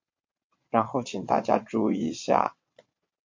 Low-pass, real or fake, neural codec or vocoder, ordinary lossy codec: 7.2 kHz; fake; vocoder, 22.05 kHz, 80 mel bands, WaveNeXt; MP3, 48 kbps